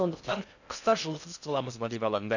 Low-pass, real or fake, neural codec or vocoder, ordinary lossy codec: 7.2 kHz; fake; codec, 16 kHz in and 24 kHz out, 0.6 kbps, FocalCodec, streaming, 2048 codes; none